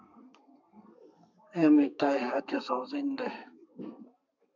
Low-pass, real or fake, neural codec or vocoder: 7.2 kHz; fake; codec, 32 kHz, 1.9 kbps, SNAC